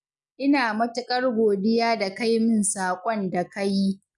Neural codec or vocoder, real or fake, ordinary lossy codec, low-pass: none; real; none; 10.8 kHz